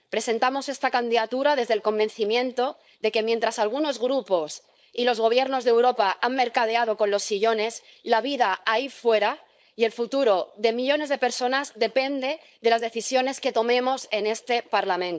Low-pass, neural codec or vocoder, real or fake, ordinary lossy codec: none; codec, 16 kHz, 4.8 kbps, FACodec; fake; none